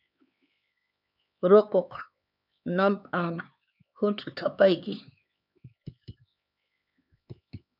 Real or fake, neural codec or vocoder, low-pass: fake; codec, 16 kHz, 4 kbps, X-Codec, HuBERT features, trained on LibriSpeech; 5.4 kHz